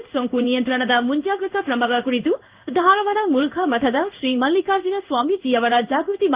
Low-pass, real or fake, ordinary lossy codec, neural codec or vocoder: 3.6 kHz; fake; Opus, 32 kbps; codec, 16 kHz in and 24 kHz out, 1 kbps, XY-Tokenizer